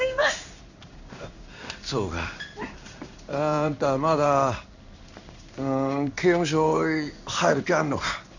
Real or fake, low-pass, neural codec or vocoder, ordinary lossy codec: fake; 7.2 kHz; codec, 16 kHz in and 24 kHz out, 1 kbps, XY-Tokenizer; none